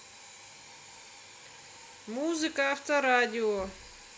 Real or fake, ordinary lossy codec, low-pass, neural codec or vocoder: real; none; none; none